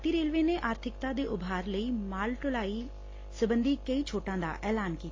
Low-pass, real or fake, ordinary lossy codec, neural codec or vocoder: 7.2 kHz; real; AAC, 32 kbps; none